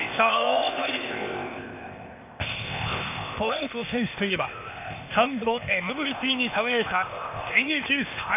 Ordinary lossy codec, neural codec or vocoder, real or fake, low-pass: none; codec, 16 kHz, 0.8 kbps, ZipCodec; fake; 3.6 kHz